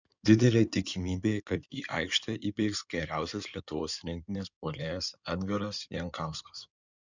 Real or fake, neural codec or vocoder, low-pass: fake; codec, 16 kHz in and 24 kHz out, 2.2 kbps, FireRedTTS-2 codec; 7.2 kHz